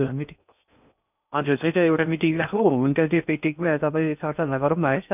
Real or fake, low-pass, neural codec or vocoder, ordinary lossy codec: fake; 3.6 kHz; codec, 16 kHz in and 24 kHz out, 0.6 kbps, FocalCodec, streaming, 2048 codes; none